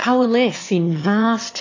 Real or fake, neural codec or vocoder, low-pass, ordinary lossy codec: fake; autoencoder, 22.05 kHz, a latent of 192 numbers a frame, VITS, trained on one speaker; 7.2 kHz; AAC, 48 kbps